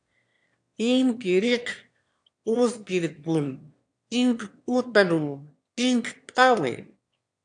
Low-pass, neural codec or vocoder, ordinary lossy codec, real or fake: 9.9 kHz; autoencoder, 22.05 kHz, a latent of 192 numbers a frame, VITS, trained on one speaker; AAC, 64 kbps; fake